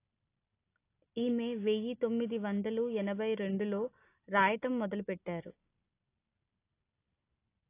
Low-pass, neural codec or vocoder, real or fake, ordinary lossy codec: 3.6 kHz; none; real; AAC, 24 kbps